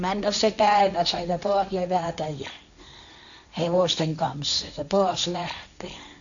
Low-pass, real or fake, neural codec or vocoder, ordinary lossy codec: 7.2 kHz; fake; codec, 16 kHz, 1.1 kbps, Voila-Tokenizer; none